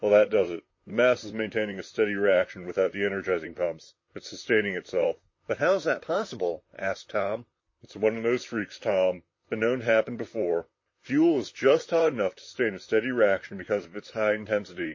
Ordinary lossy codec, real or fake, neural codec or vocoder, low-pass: MP3, 32 kbps; fake; vocoder, 44.1 kHz, 128 mel bands, Pupu-Vocoder; 7.2 kHz